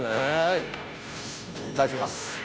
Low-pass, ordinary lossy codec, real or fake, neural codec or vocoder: none; none; fake; codec, 16 kHz, 0.5 kbps, FunCodec, trained on Chinese and English, 25 frames a second